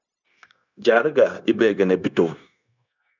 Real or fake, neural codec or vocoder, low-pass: fake; codec, 16 kHz, 0.9 kbps, LongCat-Audio-Codec; 7.2 kHz